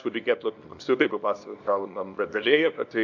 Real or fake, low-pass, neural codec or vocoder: fake; 7.2 kHz; codec, 24 kHz, 0.9 kbps, WavTokenizer, small release